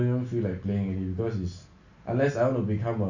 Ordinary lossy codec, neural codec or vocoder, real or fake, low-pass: none; none; real; 7.2 kHz